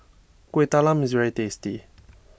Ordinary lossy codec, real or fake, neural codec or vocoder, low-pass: none; real; none; none